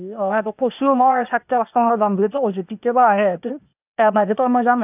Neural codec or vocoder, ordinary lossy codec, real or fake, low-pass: codec, 16 kHz, 0.8 kbps, ZipCodec; none; fake; 3.6 kHz